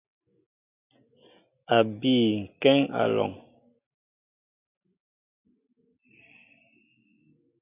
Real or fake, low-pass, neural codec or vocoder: real; 3.6 kHz; none